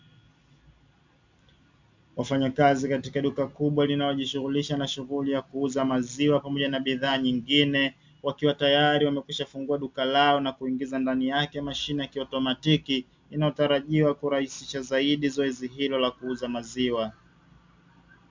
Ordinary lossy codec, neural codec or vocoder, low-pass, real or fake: MP3, 64 kbps; none; 7.2 kHz; real